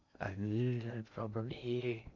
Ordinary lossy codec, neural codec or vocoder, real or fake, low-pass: none; codec, 16 kHz in and 24 kHz out, 0.6 kbps, FocalCodec, streaming, 2048 codes; fake; 7.2 kHz